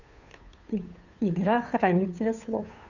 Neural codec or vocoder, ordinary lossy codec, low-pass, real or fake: codec, 16 kHz, 2 kbps, FunCodec, trained on Chinese and English, 25 frames a second; none; 7.2 kHz; fake